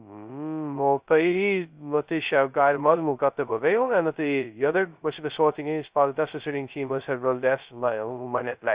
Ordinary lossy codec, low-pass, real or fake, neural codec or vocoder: Opus, 64 kbps; 3.6 kHz; fake; codec, 16 kHz, 0.2 kbps, FocalCodec